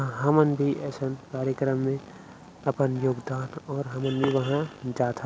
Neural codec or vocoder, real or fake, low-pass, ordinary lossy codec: none; real; none; none